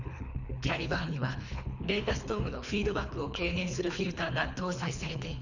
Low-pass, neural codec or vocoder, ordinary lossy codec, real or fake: 7.2 kHz; codec, 24 kHz, 3 kbps, HILCodec; none; fake